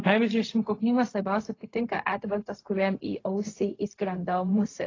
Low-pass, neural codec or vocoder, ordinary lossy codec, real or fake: 7.2 kHz; codec, 16 kHz, 0.4 kbps, LongCat-Audio-Codec; AAC, 32 kbps; fake